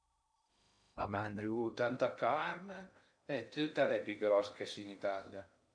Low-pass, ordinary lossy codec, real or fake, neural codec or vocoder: 9.9 kHz; none; fake; codec, 16 kHz in and 24 kHz out, 0.6 kbps, FocalCodec, streaming, 2048 codes